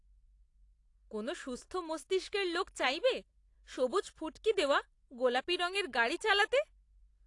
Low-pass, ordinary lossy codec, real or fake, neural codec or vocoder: 10.8 kHz; AAC, 48 kbps; real; none